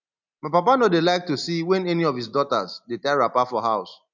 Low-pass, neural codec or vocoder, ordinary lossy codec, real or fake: 7.2 kHz; none; none; real